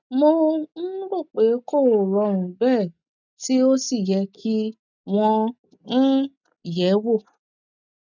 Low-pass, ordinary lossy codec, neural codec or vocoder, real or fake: 7.2 kHz; none; none; real